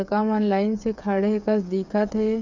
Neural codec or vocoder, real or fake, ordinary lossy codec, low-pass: codec, 16 kHz, 8 kbps, FreqCodec, smaller model; fake; none; 7.2 kHz